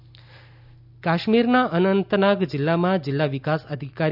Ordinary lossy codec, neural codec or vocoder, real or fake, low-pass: none; none; real; 5.4 kHz